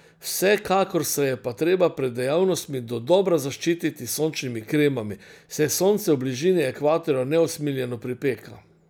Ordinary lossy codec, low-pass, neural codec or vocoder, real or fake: none; none; none; real